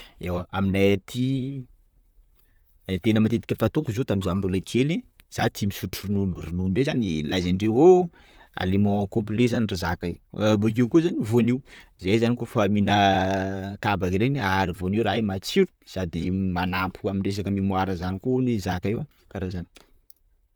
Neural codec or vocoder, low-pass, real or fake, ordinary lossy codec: vocoder, 44.1 kHz, 128 mel bands, Pupu-Vocoder; none; fake; none